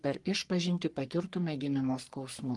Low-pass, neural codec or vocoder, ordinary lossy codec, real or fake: 10.8 kHz; codec, 44.1 kHz, 3.4 kbps, Pupu-Codec; Opus, 24 kbps; fake